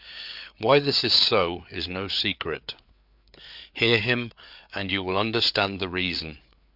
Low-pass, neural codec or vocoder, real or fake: 5.4 kHz; codec, 16 kHz, 4 kbps, FreqCodec, larger model; fake